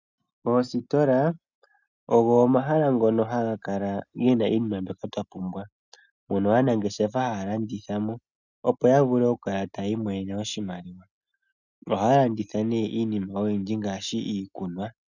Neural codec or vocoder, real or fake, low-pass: none; real; 7.2 kHz